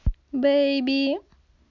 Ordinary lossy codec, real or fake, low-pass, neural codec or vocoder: none; real; 7.2 kHz; none